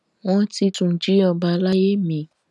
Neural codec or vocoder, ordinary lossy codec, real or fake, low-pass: none; none; real; none